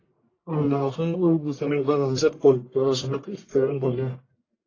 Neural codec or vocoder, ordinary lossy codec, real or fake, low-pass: codec, 44.1 kHz, 1.7 kbps, Pupu-Codec; AAC, 32 kbps; fake; 7.2 kHz